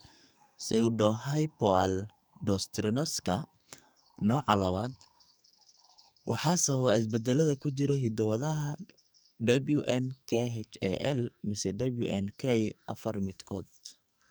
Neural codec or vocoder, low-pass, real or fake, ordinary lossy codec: codec, 44.1 kHz, 2.6 kbps, SNAC; none; fake; none